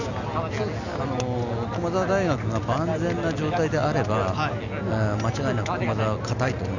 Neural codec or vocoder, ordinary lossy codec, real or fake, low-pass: none; none; real; 7.2 kHz